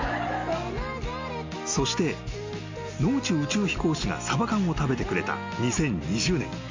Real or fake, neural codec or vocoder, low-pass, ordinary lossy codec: real; none; 7.2 kHz; MP3, 48 kbps